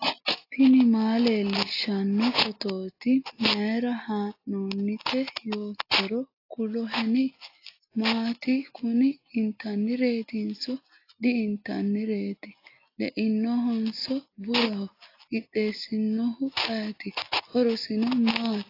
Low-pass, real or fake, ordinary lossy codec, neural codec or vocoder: 5.4 kHz; real; AAC, 32 kbps; none